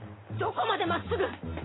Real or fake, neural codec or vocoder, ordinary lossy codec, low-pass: real; none; AAC, 16 kbps; 7.2 kHz